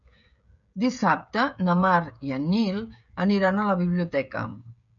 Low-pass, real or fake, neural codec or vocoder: 7.2 kHz; fake; codec, 16 kHz, 16 kbps, FreqCodec, smaller model